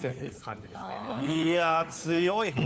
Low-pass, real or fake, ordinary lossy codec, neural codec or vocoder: none; fake; none; codec, 16 kHz, 4 kbps, FunCodec, trained on LibriTTS, 50 frames a second